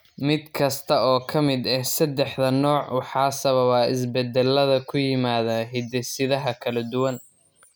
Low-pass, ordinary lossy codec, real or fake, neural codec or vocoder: none; none; real; none